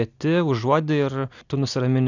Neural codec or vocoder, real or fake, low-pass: none; real; 7.2 kHz